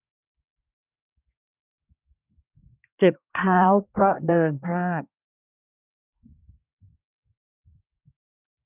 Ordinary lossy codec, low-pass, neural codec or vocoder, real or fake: none; 3.6 kHz; codec, 24 kHz, 1 kbps, SNAC; fake